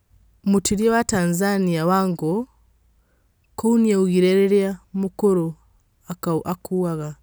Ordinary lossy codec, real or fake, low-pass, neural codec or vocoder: none; real; none; none